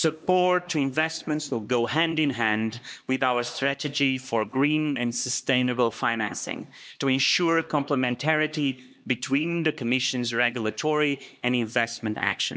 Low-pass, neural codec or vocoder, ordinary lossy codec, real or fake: none; codec, 16 kHz, 2 kbps, X-Codec, HuBERT features, trained on LibriSpeech; none; fake